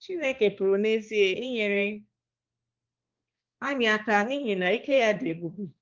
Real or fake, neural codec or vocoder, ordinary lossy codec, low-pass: fake; codec, 16 kHz, 2 kbps, X-Codec, HuBERT features, trained on balanced general audio; Opus, 32 kbps; 7.2 kHz